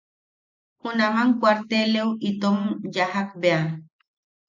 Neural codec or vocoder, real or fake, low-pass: none; real; 7.2 kHz